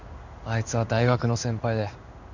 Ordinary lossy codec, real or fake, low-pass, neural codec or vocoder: none; fake; 7.2 kHz; codec, 16 kHz in and 24 kHz out, 1 kbps, XY-Tokenizer